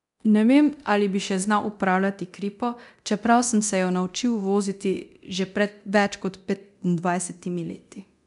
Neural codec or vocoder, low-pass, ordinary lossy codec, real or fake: codec, 24 kHz, 0.9 kbps, DualCodec; 10.8 kHz; none; fake